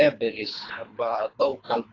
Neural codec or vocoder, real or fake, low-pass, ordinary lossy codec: codec, 24 kHz, 3 kbps, HILCodec; fake; 7.2 kHz; AAC, 32 kbps